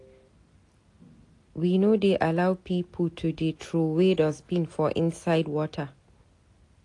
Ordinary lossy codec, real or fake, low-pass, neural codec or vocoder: AAC, 48 kbps; real; 10.8 kHz; none